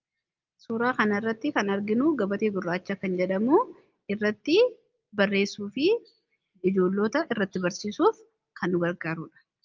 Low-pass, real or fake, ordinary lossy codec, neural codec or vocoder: 7.2 kHz; real; Opus, 24 kbps; none